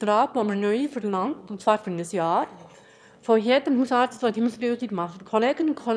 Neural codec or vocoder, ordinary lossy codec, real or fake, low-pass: autoencoder, 22.05 kHz, a latent of 192 numbers a frame, VITS, trained on one speaker; none; fake; none